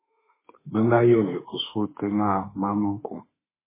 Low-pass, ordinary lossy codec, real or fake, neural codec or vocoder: 3.6 kHz; MP3, 16 kbps; fake; autoencoder, 48 kHz, 32 numbers a frame, DAC-VAE, trained on Japanese speech